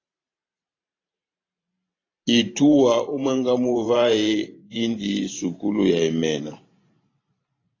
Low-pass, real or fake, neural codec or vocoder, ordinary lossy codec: 7.2 kHz; fake; vocoder, 44.1 kHz, 128 mel bands every 512 samples, BigVGAN v2; AAC, 32 kbps